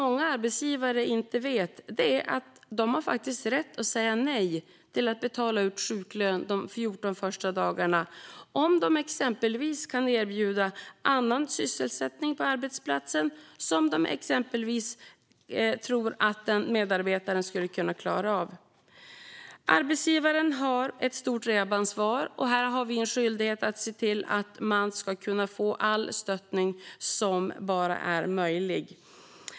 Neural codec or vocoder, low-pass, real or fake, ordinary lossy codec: none; none; real; none